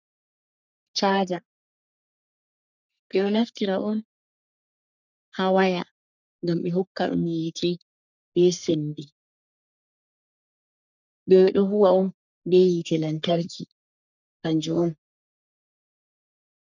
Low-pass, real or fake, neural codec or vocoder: 7.2 kHz; fake; codec, 44.1 kHz, 3.4 kbps, Pupu-Codec